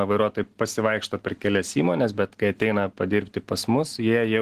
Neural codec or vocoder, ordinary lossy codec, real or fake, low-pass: none; Opus, 16 kbps; real; 14.4 kHz